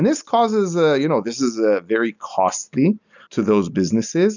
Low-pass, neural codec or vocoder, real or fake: 7.2 kHz; none; real